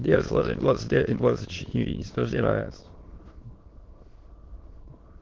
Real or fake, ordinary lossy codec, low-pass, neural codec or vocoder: fake; Opus, 16 kbps; 7.2 kHz; autoencoder, 22.05 kHz, a latent of 192 numbers a frame, VITS, trained on many speakers